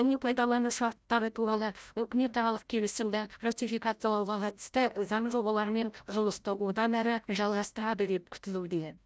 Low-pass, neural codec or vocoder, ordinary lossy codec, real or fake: none; codec, 16 kHz, 0.5 kbps, FreqCodec, larger model; none; fake